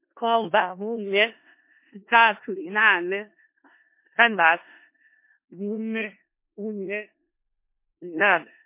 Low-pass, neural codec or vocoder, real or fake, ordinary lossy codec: 3.6 kHz; codec, 16 kHz in and 24 kHz out, 0.4 kbps, LongCat-Audio-Codec, four codebook decoder; fake; MP3, 24 kbps